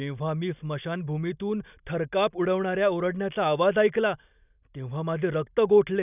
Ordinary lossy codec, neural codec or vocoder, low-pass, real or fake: none; none; 3.6 kHz; real